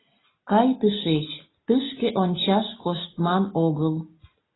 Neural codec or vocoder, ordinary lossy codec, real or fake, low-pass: none; AAC, 16 kbps; real; 7.2 kHz